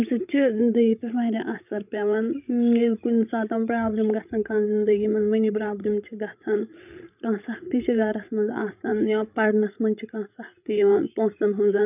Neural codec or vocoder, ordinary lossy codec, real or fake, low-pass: codec, 16 kHz, 16 kbps, FreqCodec, larger model; none; fake; 3.6 kHz